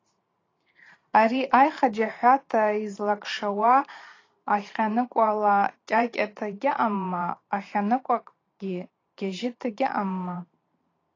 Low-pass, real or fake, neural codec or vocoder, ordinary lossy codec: 7.2 kHz; fake; vocoder, 44.1 kHz, 128 mel bands every 512 samples, BigVGAN v2; AAC, 32 kbps